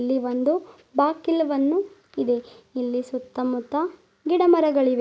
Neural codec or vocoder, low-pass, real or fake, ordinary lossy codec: none; none; real; none